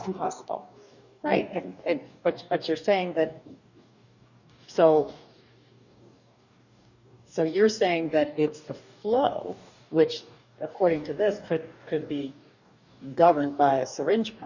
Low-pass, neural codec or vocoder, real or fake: 7.2 kHz; codec, 44.1 kHz, 2.6 kbps, DAC; fake